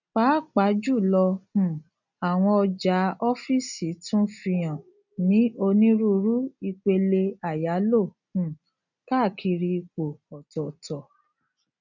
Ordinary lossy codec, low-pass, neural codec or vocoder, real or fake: none; 7.2 kHz; none; real